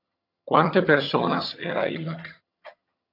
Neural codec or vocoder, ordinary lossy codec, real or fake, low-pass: vocoder, 22.05 kHz, 80 mel bands, HiFi-GAN; AAC, 32 kbps; fake; 5.4 kHz